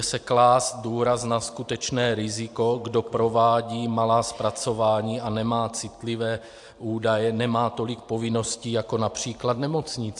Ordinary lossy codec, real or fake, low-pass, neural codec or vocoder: AAC, 64 kbps; real; 10.8 kHz; none